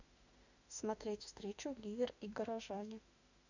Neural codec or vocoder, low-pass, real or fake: autoencoder, 48 kHz, 32 numbers a frame, DAC-VAE, trained on Japanese speech; 7.2 kHz; fake